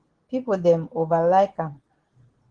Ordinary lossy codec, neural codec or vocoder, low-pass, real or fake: Opus, 16 kbps; none; 9.9 kHz; real